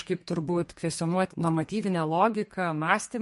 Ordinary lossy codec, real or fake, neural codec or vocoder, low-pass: MP3, 48 kbps; fake; codec, 32 kHz, 1.9 kbps, SNAC; 14.4 kHz